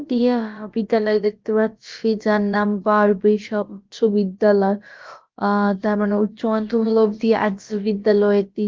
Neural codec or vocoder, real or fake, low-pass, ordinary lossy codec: codec, 16 kHz, about 1 kbps, DyCAST, with the encoder's durations; fake; 7.2 kHz; Opus, 32 kbps